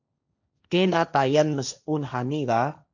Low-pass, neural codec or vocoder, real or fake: 7.2 kHz; codec, 16 kHz, 1.1 kbps, Voila-Tokenizer; fake